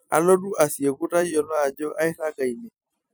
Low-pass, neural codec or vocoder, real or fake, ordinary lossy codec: none; vocoder, 44.1 kHz, 128 mel bands every 256 samples, BigVGAN v2; fake; none